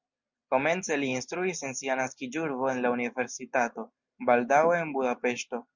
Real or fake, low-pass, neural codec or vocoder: real; 7.2 kHz; none